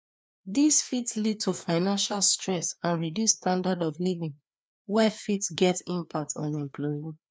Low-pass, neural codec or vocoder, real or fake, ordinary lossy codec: none; codec, 16 kHz, 2 kbps, FreqCodec, larger model; fake; none